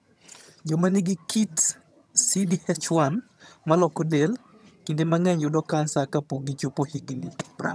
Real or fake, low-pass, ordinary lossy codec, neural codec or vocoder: fake; none; none; vocoder, 22.05 kHz, 80 mel bands, HiFi-GAN